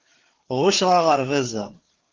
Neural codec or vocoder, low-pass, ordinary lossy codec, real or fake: codec, 16 kHz, 4 kbps, FreqCodec, larger model; 7.2 kHz; Opus, 16 kbps; fake